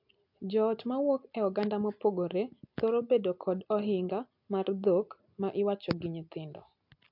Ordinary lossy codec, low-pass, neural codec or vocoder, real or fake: MP3, 48 kbps; 5.4 kHz; none; real